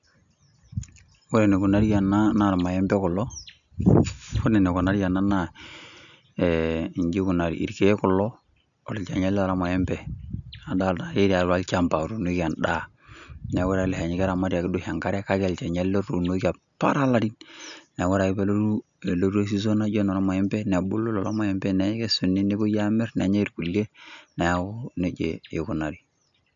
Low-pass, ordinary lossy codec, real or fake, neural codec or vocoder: 7.2 kHz; none; real; none